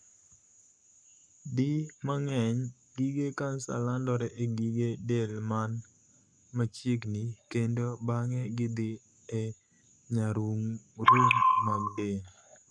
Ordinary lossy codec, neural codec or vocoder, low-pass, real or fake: none; codec, 44.1 kHz, 7.8 kbps, Pupu-Codec; 9.9 kHz; fake